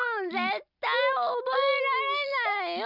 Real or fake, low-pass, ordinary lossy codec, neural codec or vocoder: real; 5.4 kHz; none; none